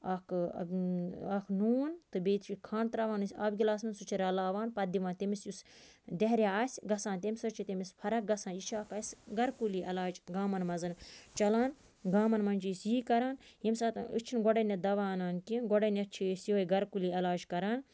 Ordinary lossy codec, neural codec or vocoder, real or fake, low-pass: none; none; real; none